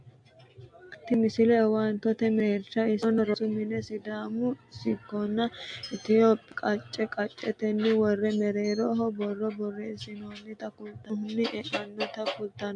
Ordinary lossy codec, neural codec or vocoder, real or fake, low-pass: AAC, 64 kbps; none; real; 9.9 kHz